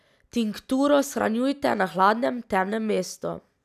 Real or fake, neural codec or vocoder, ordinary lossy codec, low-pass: real; none; none; 14.4 kHz